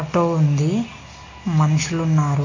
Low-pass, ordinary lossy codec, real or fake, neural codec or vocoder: 7.2 kHz; AAC, 32 kbps; real; none